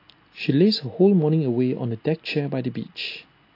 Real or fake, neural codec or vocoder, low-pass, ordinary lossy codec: real; none; 5.4 kHz; AAC, 32 kbps